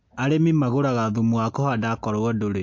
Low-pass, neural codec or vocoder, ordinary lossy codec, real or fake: 7.2 kHz; none; MP3, 48 kbps; real